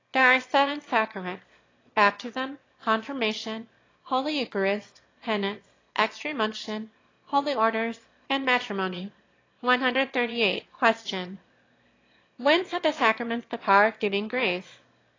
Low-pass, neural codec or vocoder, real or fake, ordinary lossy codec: 7.2 kHz; autoencoder, 22.05 kHz, a latent of 192 numbers a frame, VITS, trained on one speaker; fake; AAC, 32 kbps